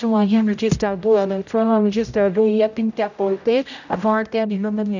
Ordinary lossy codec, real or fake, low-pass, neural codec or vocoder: none; fake; 7.2 kHz; codec, 16 kHz, 0.5 kbps, X-Codec, HuBERT features, trained on general audio